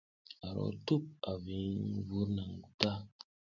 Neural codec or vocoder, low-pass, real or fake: none; 7.2 kHz; real